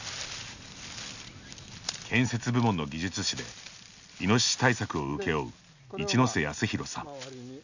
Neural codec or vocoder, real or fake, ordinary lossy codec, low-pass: none; real; none; 7.2 kHz